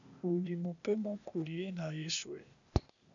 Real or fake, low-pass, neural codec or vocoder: fake; 7.2 kHz; codec, 16 kHz, 0.8 kbps, ZipCodec